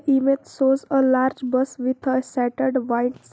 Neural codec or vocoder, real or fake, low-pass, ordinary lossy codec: none; real; none; none